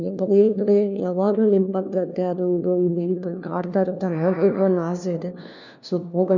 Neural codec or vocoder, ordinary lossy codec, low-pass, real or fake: codec, 16 kHz, 1 kbps, FunCodec, trained on LibriTTS, 50 frames a second; none; 7.2 kHz; fake